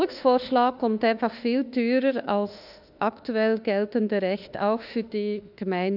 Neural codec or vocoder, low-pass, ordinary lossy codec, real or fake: autoencoder, 48 kHz, 32 numbers a frame, DAC-VAE, trained on Japanese speech; 5.4 kHz; none; fake